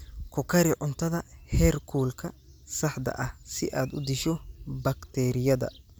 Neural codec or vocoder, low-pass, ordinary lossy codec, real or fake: none; none; none; real